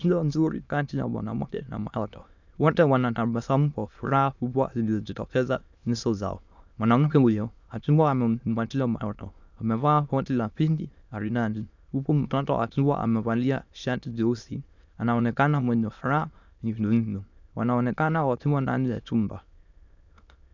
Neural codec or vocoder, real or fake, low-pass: autoencoder, 22.05 kHz, a latent of 192 numbers a frame, VITS, trained on many speakers; fake; 7.2 kHz